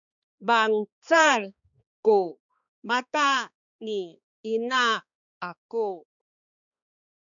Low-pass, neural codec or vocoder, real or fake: 7.2 kHz; codec, 16 kHz, 2 kbps, X-Codec, HuBERT features, trained on balanced general audio; fake